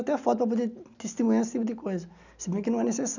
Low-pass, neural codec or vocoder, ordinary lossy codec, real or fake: 7.2 kHz; none; none; real